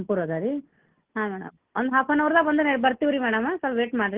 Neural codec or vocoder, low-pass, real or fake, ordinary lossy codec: none; 3.6 kHz; real; Opus, 16 kbps